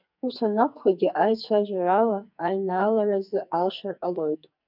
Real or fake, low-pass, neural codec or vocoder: fake; 5.4 kHz; codec, 44.1 kHz, 2.6 kbps, SNAC